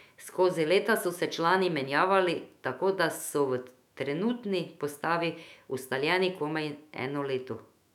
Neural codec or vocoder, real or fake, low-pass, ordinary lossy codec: autoencoder, 48 kHz, 128 numbers a frame, DAC-VAE, trained on Japanese speech; fake; 19.8 kHz; none